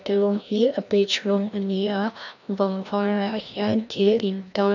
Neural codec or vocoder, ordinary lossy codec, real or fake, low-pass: codec, 16 kHz, 1 kbps, FreqCodec, larger model; none; fake; 7.2 kHz